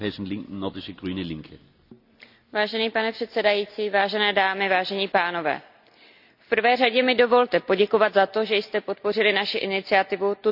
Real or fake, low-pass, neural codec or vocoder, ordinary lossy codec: real; 5.4 kHz; none; none